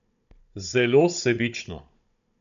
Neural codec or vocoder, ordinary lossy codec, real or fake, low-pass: codec, 16 kHz, 16 kbps, FunCodec, trained on Chinese and English, 50 frames a second; none; fake; 7.2 kHz